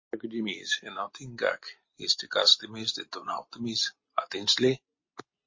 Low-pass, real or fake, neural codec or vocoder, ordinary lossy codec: 7.2 kHz; real; none; MP3, 32 kbps